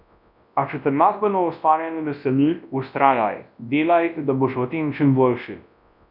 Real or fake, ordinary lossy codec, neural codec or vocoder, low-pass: fake; none; codec, 24 kHz, 0.9 kbps, WavTokenizer, large speech release; 5.4 kHz